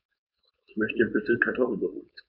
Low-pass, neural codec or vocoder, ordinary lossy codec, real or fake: 5.4 kHz; codec, 16 kHz, 4.8 kbps, FACodec; none; fake